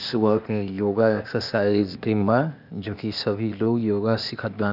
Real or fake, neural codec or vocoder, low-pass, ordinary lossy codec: fake; codec, 16 kHz, 0.8 kbps, ZipCodec; 5.4 kHz; none